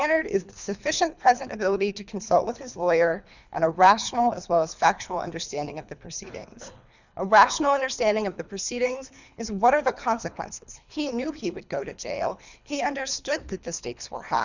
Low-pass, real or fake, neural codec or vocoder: 7.2 kHz; fake; codec, 24 kHz, 3 kbps, HILCodec